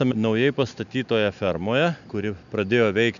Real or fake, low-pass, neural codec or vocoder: real; 7.2 kHz; none